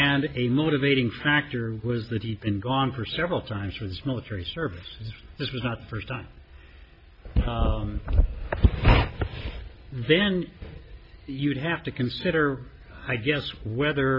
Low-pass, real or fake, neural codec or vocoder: 5.4 kHz; real; none